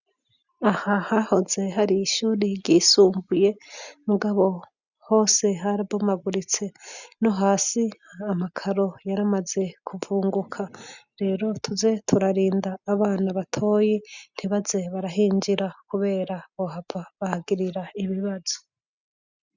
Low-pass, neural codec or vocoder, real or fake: 7.2 kHz; none; real